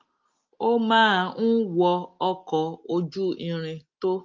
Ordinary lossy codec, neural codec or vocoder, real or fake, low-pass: Opus, 24 kbps; none; real; 7.2 kHz